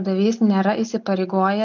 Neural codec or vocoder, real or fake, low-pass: none; real; 7.2 kHz